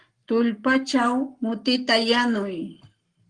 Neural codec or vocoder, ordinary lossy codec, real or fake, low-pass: codec, 44.1 kHz, 7.8 kbps, Pupu-Codec; Opus, 24 kbps; fake; 9.9 kHz